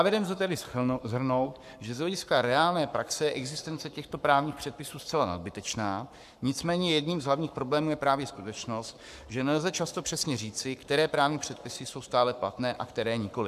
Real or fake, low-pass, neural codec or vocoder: fake; 14.4 kHz; codec, 44.1 kHz, 7.8 kbps, Pupu-Codec